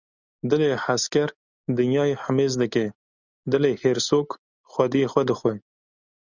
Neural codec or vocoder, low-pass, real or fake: none; 7.2 kHz; real